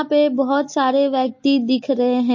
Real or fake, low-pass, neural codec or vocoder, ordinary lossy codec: real; 7.2 kHz; none; MP3, 32 kbps